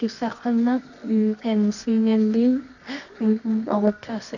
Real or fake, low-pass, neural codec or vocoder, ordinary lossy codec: fake; 7.2 kHz; codec, 24 kHz, 0.9 kbps, WavTokenizer, medium music audio release; none